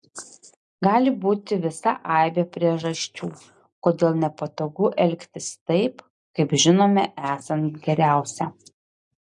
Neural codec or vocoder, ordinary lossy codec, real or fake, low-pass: none; MP3, 64 kbps; real; 10.8 kHz